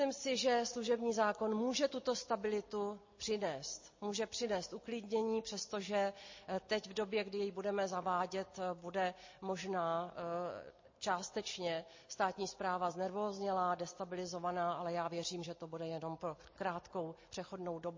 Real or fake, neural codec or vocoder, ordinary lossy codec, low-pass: real; none; MP3, 32 kbps; 7.2 kHz